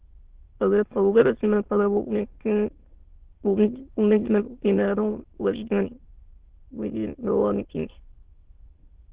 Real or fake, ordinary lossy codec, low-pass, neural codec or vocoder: fake; Opus, 16 kbps; 3.6 kHz; autoencoder, 22.05 kHz, a latent of 192 numbers a frame, VITS, trained on many speakers